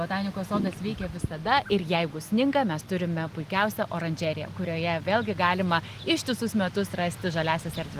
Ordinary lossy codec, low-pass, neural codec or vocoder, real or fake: Opus, 24 kbps; 14.4 kHz; none; real